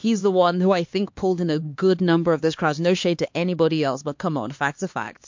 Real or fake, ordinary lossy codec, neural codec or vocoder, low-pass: fake; MP3, 48 kbps; codec, 16 kHz, 2 kbps, X-Codec, HuBERT features, trained on LibriSpeech; 7.2 kHz